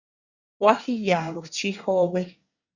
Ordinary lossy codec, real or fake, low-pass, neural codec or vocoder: Opus, 64 kbps; fake; 7.2 kHz; codec, 24 kHz, 1 kbps, SNAC